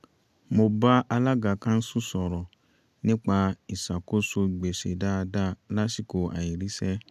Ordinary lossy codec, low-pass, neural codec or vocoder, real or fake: none; 14.4 kHz; none; real